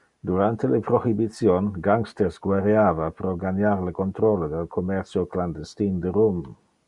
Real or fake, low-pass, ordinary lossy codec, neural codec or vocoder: real; 10.8 kHz; Opus, 64 kbps; none